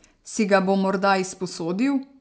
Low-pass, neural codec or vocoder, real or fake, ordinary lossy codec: none; none; real; none